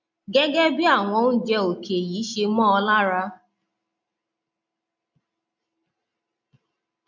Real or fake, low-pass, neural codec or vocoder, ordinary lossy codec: real; 7.2 kHz; none; MP3, 48 kbps